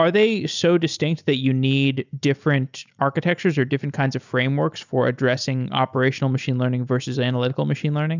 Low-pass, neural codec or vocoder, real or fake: 7.2 kHz; none; real